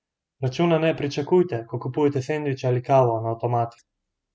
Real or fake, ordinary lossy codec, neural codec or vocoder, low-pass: real; none; none; none